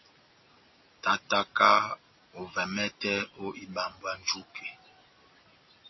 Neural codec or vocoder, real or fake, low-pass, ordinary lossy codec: none; real; 7.2 kHz; MP3, 24 kbps